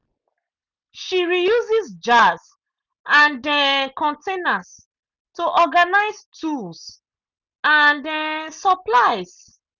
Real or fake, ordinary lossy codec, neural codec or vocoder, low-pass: real; none; none; 7.2 kHz